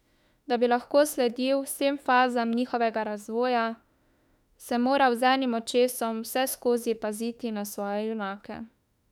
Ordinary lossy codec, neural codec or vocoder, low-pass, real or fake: none; autoencoder, 48 kHz, 32 numbers a frame, DAC-VAE, trained on Japanese speech; 19.8 kHz; fake